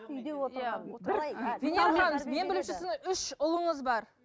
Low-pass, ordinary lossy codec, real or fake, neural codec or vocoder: none; none; real; none